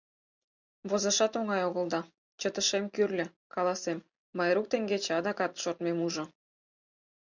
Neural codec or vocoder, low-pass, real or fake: none; 7.2 kHz; real